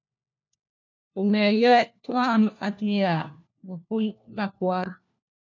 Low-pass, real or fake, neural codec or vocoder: 7.2 kHz; fake; codec, 16 kHz, 1 kbps, FunCodec, trained on LibriTTS, 50 frames a second